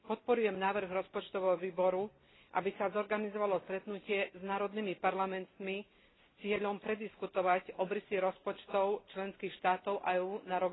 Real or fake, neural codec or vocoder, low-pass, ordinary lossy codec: real; none; 7.2 kHz; AAC, 16 kbps